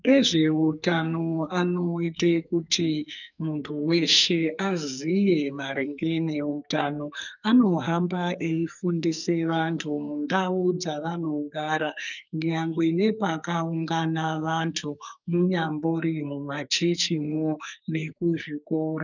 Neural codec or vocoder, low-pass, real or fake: codec, 44.1 kHz, 2.6 kbps, SNAC; 7.2 kHz; fake